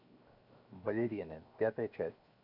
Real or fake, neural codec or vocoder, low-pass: fake; codec, 16 kHz, 0.7 kbps, FocalCodec; 5.4 kHz